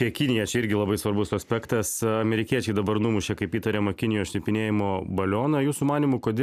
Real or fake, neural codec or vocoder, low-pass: real; none; 14.4 kHz